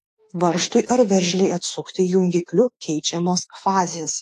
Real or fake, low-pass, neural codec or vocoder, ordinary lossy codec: fake; 14.4 kHz; autoencoder, 48 kHz, 32 numbers a frame, DAC-VAE, trained on Japanese speech; AAC, 48 kbps